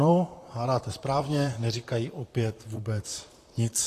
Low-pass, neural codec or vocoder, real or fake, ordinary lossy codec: 14.4 kHz; vocoder, 44.1 kHz, 128 mel bands, Pupu-Vocoder; fake; AAC, 48 kbps